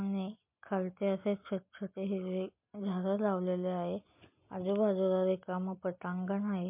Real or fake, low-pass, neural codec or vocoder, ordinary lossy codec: real; 3.6 kHz; none; MP3, 32 kbps